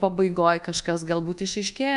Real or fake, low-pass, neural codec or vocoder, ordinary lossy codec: fake; 10.8 kHz; codec, 24 kHz, 1.2 kbps, DualCodec; AAC, 96 kbps